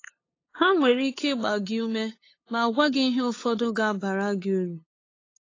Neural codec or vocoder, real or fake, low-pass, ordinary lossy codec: codec, 16 kHz, 8 kbps, FunCodec, trained on LibriTTS, 25 frames a second; fake; 7.2 kHz; AAC, 32 kbps